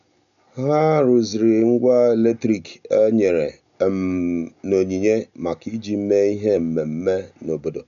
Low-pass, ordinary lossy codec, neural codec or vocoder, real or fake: 7.2 kHz; none; none; real